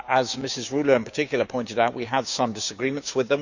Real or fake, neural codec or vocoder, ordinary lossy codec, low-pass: fake; codec, 16 kHz, 6 kbps, DAC; none; 7.2 kHz